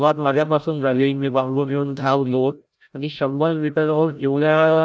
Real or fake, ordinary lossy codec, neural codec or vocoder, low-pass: fake; none; codec, 16 kHz, 0.5 kbps, FreqCodec, larger model; none